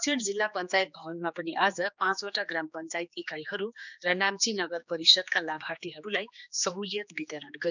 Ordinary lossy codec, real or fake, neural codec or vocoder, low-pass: none; fake; codec, 16 kHz, 2 kbps, X-Codec, HuBERT features, trained on general audio; 7.2 kHz